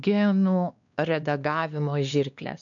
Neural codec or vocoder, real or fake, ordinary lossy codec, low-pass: codec, 16 kHz, 2 kbps, X-Codec, WavLM features, trained on Multilingual LibriSpeech; fake; MP3, 96 kbps; 7.2 kHz